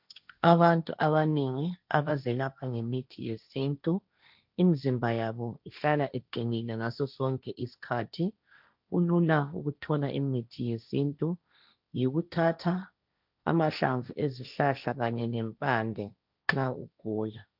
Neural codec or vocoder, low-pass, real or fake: codec, 16 kHz, 1.1 kbps, Voila-Tokenizer; 5.4 kHz; fake